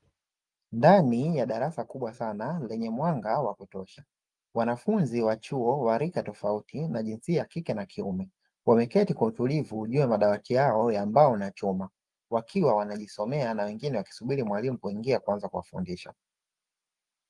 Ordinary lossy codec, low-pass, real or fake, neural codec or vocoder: Opus, 32 kbps; 10.8 kHz; real; none